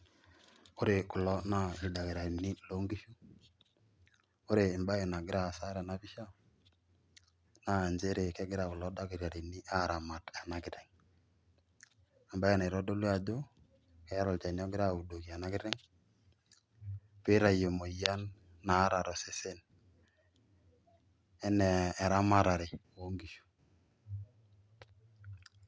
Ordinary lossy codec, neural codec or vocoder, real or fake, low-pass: none; none; real; none